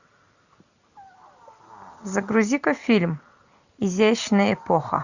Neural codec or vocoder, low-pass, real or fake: none; 7.2 kHz; real